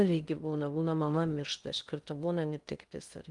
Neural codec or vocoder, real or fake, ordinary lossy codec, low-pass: codec, 16 kHz in and 24 kHz out, 0.6 kbps, FocalCodec, streaming, 2048 codes; fake; Opus, 32 kbps; 10.8 kHz